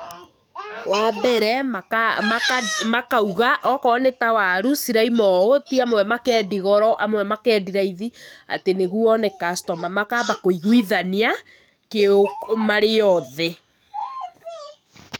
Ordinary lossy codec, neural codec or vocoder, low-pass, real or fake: none; codec, 44.1 kHz, 7.8 kbps, DAC; 19.8 kHz; fake